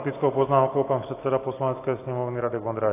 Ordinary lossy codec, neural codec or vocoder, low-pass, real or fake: AAC, 24 kbps; none; 3.6 kHz; real